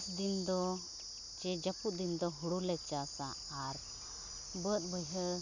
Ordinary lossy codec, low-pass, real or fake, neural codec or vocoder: none; 7.2 kHz; real; none